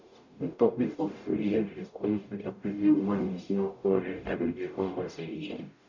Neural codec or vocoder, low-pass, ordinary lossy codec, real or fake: codec, 44.1 kHz, 0.9 kbps, DAC; 7.2 kHz; none; fake